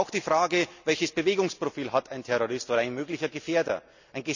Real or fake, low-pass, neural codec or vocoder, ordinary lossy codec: real; 7.2 kHz; none; none